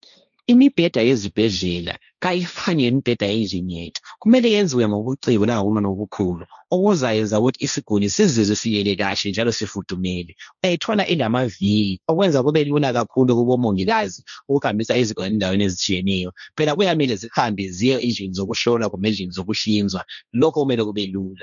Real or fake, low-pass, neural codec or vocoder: fake; 7.2 kHz; codec, 16 kHz, 1.1 kbps, Voila-Tokenizer